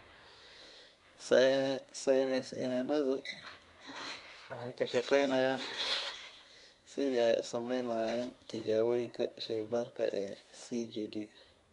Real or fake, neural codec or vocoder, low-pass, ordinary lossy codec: fake; codec, 24 kHz, 1 kbps, SNAC; 10.8 kHz; none